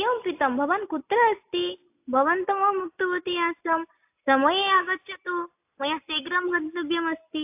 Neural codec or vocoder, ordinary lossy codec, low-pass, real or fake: none; AAC, 32 kbps; 3.6 kHz; real